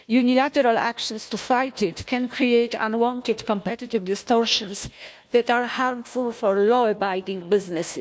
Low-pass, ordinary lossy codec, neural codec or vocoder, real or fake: none; none; codec, 16 kHz, 1 kbps, FunCodec, trained on Chinese and English, 50 frames a second; fake